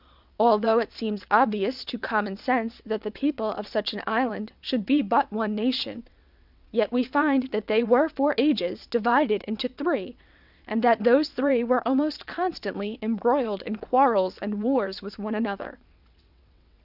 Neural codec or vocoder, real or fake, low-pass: vocoder, 22.05 kHz, 80 mel bands, WaveNeXt; fake; 5.4 kHz